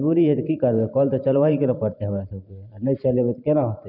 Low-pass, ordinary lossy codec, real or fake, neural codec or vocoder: 5.4 kHz; none; fake; vocoder, 44.1 kHz, 128 mel bands every 512 samples, BigVGAN v2